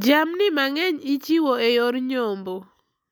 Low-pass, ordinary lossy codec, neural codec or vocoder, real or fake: 19.8 kHz; none; none; real